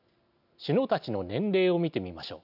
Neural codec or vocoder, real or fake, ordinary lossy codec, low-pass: none; real; none; 5.4 kHz